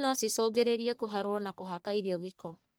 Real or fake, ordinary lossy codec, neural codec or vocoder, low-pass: fake; none; codec, 44.1 kHz, 1.7 kbps, Pupu-Codec; none